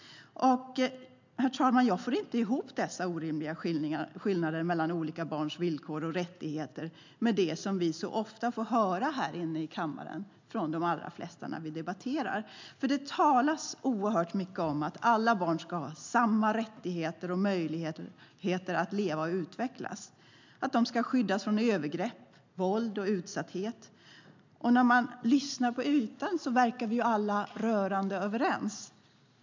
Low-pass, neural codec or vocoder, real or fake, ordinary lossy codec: 7.2 kHz; none; real; MP3, 64 kbps